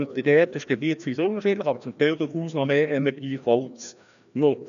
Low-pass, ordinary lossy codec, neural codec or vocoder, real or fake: 7.2 kHz; none; codec, 16 kHz, 1 kbps, FreqCodec, larger model; fake